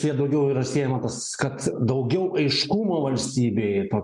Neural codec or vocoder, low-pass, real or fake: none; 10.8 kHz; real